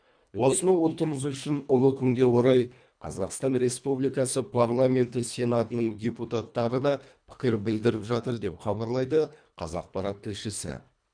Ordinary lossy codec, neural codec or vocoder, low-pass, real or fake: none; codec, 24 kHz, 1.5 kbps, HILCodec; 9.9 kHz; fake